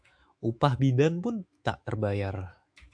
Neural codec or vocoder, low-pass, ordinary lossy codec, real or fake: autoencoder, 48 kHz, 128 numbers a frame, DAC-VAE, trained on Japanese speech; 9.9 kHz; AAC, 64 kbps; fake